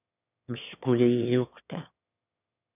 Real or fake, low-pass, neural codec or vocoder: fake; 3.6 kHz; autoencoder, 22.05 kHz, a latent of 192 numbers a frame, VITS, trained on one speaker